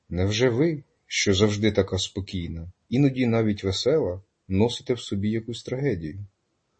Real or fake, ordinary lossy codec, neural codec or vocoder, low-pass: real; MP3, 32 kbps; none; 9.9 kHz